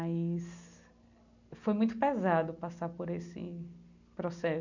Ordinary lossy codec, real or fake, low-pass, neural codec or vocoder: none; real; 7.2 kHz; none